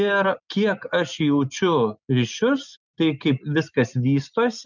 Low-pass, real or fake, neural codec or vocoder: 7.2 kHz; real; none